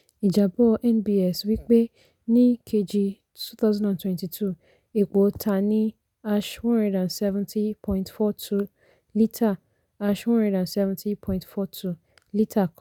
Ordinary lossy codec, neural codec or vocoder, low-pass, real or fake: none; none; 19.8 kHz; real